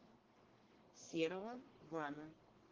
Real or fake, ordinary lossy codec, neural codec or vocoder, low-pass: fake; Opus, 16 kbps; codec, 44.1 kHz, 1.7 kbps, Pupu-Codec; 7.2 kHz